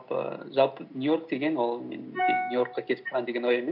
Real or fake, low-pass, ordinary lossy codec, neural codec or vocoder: real; 5.4 kHz; none; none